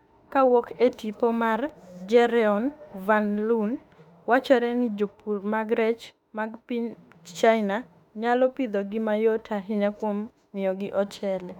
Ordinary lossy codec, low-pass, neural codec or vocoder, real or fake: none; 19.8 kHz; autoencoder, 48 kHz, 32 numbers a frame, DAC-VAE, trained on Japanese speech; fake